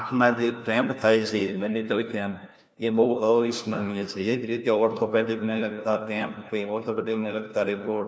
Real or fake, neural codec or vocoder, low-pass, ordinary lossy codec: fake; codec, 16 kHz, 1 kbps, FunCodec, trained on LibriTTS, 50 frames a second; none; none